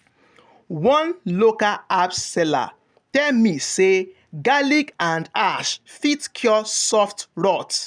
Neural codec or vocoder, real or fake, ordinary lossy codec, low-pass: none; real; none; 9.9 kHz